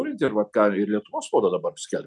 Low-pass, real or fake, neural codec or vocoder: 10.8 kHz; real; none